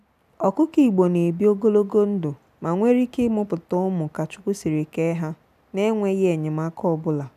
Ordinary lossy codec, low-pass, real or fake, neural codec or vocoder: none; 14.4 kHz; real; none